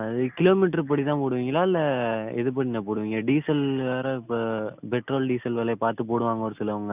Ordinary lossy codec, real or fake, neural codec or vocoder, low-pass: none; real; none; 3.6 kHz